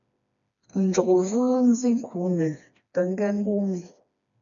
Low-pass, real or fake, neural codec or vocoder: 7.2 kHz; fake; codec, 16 kHz, 2 kbps, FreqCodec, smaller model